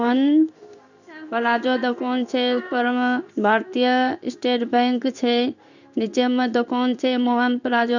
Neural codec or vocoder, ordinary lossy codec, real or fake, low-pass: codec, 16 kHz in and 24 kHz out, 1 kbps, XY-Tokenizer; none; fake; 7.2 kHz